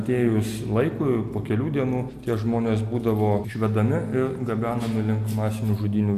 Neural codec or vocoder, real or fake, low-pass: none; real; 14.4 kHz